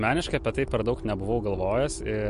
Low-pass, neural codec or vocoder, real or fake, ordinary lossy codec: 10.8 kHz; none; real; MP3, 48 kbps